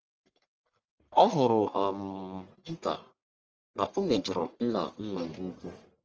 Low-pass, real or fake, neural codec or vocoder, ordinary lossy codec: 7.2 kHz; fake; codec, 44.1 kHz, 1.7 kbps, Pupu-Codec; Opus, 24 kbps